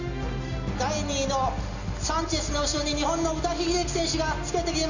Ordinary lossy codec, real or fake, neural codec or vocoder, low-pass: none; real; none; 7.2 kHz